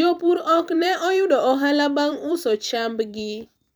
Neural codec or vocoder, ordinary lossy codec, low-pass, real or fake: vocoder, 44.1 kHz, 128 mel bands every 256 samples, BigVGAN v2; none; none; fake